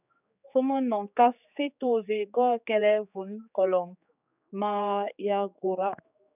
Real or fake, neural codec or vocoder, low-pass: fake; codec, 16 kHz, 4 kbps, X-Codec, HuBERT features, trained on general audio; 3.6 kHz